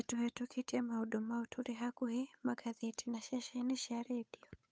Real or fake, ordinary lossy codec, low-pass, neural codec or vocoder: fake; none; none; codec, 16 kHz, 8 kbps, FunCodec, trained on Chinese and English, 25 frames a second